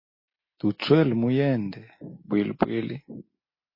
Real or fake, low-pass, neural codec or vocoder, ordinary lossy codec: real; 5.4 kHz; none; MP3, 24 kbps